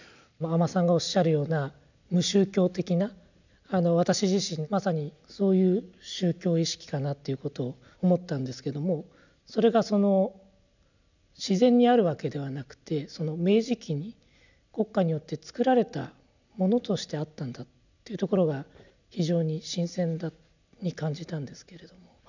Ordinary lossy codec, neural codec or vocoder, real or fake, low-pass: none; none; real; 7.2 kHz